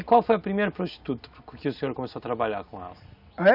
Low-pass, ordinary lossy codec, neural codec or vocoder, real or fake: 5.4 kHz; none; none; real